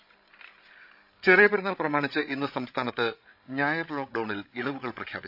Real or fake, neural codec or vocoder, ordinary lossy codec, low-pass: fake; codec, 16 kHz, 16 kbps, FreqCodec, larger model; none; 5.4 kHz